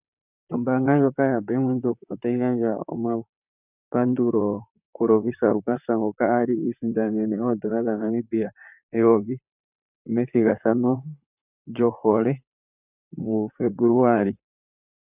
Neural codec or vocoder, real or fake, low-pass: vocoder, 44.1 kHz, 128 mel bands, Pupu-Vocoder; fake; 3.6 kHz